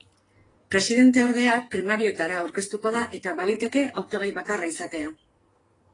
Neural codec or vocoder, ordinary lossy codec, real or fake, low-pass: codec, 44.1 kHz, 2.6 kbps, SNAC; AAC, 32 kbps; fake; 10.8 kHz